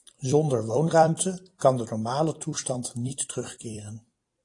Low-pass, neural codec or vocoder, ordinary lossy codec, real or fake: 10.8 kHz; vocoder, 44.1 kHz, 128 mel bands every 256 samples, BigVGAN v2; AAC, 48 kbps; fake